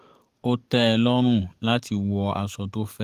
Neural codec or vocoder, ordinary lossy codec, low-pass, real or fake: codec, 44.1 kHz, 7.8 kbps, DAC; Opus, 24 kbps; 14.4 kHz; fake